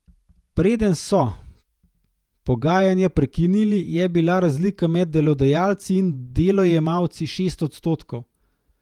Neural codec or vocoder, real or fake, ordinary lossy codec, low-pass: vocoder, 48 kHz, 128 mel bands, Vocos; fake; Opus, 32 kbps; 19.8 kHz